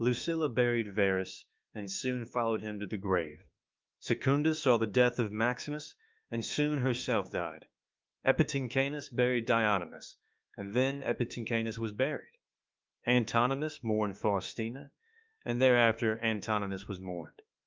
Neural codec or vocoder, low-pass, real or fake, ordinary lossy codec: autoencoder, 48 kHz, 32 numbers a frame, DAC-VAE, trained on Japanese speech; 7.2 kHz; fake; Opus, 32 kbps